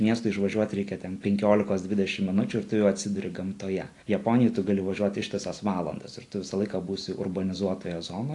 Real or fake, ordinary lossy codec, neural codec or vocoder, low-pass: real; AAC, 48 kbps; none; 10.8 kHz